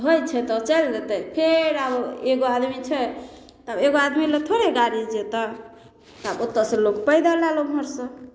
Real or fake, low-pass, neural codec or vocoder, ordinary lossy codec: real; none; none; none